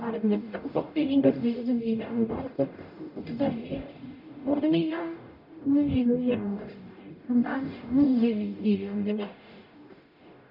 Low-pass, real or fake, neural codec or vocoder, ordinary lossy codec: 5.4 kHz; fake; codec, 44.1 kHz, 0.9 kbps, DAC; none